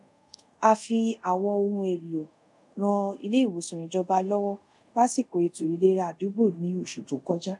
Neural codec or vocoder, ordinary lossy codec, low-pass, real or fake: codec, 24 kHz, 0.5 kbps, DualCodec; MP3, 96 kbps; 10.8 kHz; fake